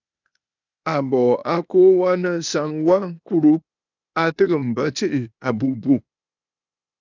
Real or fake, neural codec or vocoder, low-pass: fake; codec, 16 kHz, 0.8 kbps, ZipCodec; 7.2 kHz